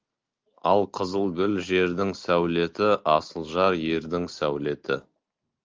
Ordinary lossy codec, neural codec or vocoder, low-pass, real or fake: Opus, 24 kbps; none; 7.2 kHz; real